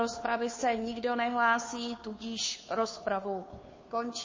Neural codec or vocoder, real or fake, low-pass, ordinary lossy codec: codec, 16 kHz, 2 kbps, FunCodec, trained on Chinese and English, 25 frames a second; fake; 7.2 kHz; MP3, 32 kbps